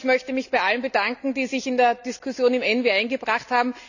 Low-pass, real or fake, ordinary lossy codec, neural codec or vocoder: 7.2 kHz; real; none; none